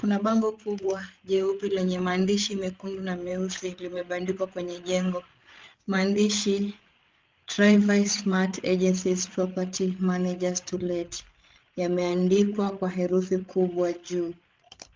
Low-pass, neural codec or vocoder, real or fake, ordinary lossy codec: 7.2 kHz; codec, 16 kHz, 8 kbps, FreqCodec, larger model; fake; Opus, 32 kbps